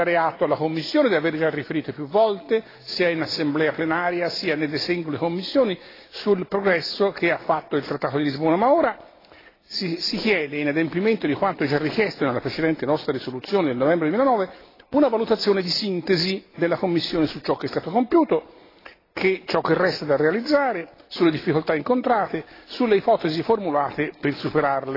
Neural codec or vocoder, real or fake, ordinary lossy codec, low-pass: none; real; AAC, 24 kbps; 5.4 kHz